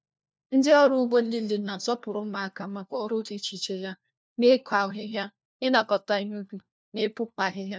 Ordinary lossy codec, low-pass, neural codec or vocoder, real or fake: none; none; codec, 16 kHz, 1 kbps, FunCodec, trained on LibriTTS, 50 frames a second; fake